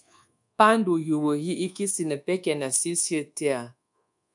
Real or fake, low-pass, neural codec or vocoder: fake; 10.8 kHz; codec, 24 kHz, 1.2 kbps, DualCodec